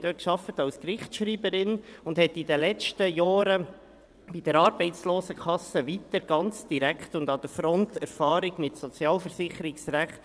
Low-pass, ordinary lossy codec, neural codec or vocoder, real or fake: none; none; vocoder, 22.05 kHz, 80 mel bands, WaveNeXt; fake